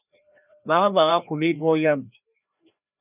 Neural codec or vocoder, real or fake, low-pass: codec, 16 kHz, 1 kbps, FreqCodec, larger model; fake; 3.6 kHz